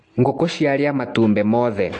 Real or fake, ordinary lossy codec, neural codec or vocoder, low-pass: real; AAC, 48 kbps; none; 10.8 kHz